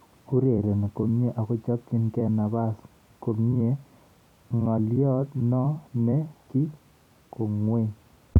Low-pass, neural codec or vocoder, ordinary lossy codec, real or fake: 19.8 kHz; vocoder, 44.1 kHz, 128 mel bands every 256 samples, BigVGAN v2; none; fake